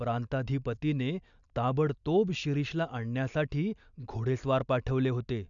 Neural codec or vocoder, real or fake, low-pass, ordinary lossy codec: none; real; 7.2 kHz; MP3, 96 kbps